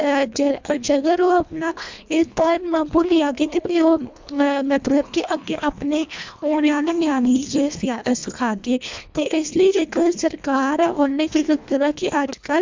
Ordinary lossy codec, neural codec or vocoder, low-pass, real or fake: MP3, 64 kbps; codec, 24 kHz, 1.5 kbps, HILCodec; 7.2 kHz; fake